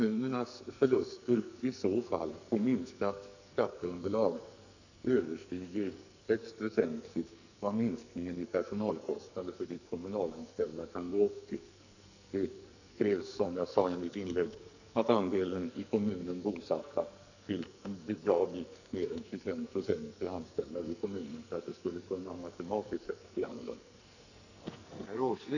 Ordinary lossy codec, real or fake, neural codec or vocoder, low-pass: none; fake; codec, 44.1 kHz, 2.6 kbps, SNAC; 7.2 kHz